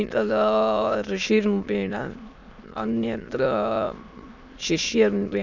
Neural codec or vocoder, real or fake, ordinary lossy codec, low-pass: autoencoder, 22.05 kHz, a latent of 192 numbers a frame, VITS, trained on many speakers; fake; none; 7.2 kHz